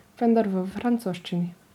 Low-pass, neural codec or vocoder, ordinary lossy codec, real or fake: 19.8 kHz; none; none; real